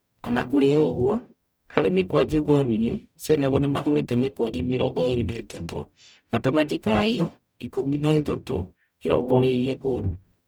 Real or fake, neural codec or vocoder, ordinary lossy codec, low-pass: fake; codec, 44.1 kHz, 0.9 kbps, DAC; none; none